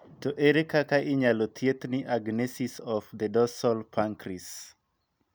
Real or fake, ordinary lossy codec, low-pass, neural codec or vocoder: real; none; none; none